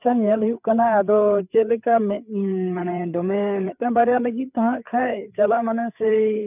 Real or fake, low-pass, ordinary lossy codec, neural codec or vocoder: fake; 3.6 kHz; none; codec, 16 kHz, 4 kbps, FreqCodec, larger model